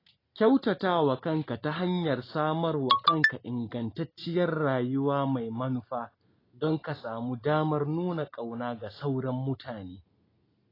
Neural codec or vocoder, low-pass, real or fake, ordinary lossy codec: none; 5.4 kHz; real; AAC, 24 kbps